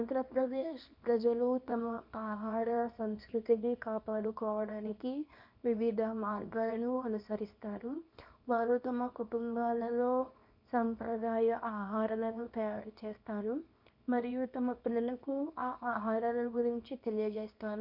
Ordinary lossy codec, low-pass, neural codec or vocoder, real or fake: none; 5.4 kHz; codec, 24 kHz, 0.9 kbps, WavTokenizer, small release; fake